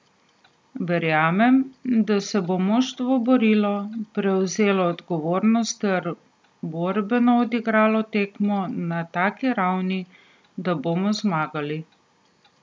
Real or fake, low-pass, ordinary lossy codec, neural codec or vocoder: real; none; none; none